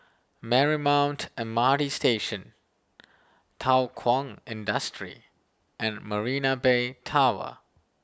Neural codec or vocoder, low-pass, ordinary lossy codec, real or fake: none; none; none; real